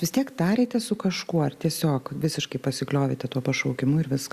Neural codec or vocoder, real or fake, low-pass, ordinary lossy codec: none; real; 14.4 kHz; Opus, 64 kbps